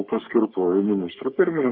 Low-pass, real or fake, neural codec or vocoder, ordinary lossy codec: 5.4 kHz; fake; codec, 44.1 kHz, 3.4 kbps, Pupu-Codec; Opus, 64 kbps